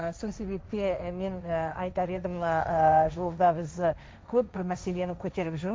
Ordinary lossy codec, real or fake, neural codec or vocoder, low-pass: none; fake; codec, 16 kHz, 1.1 kbps, Voila-Tokenizer; none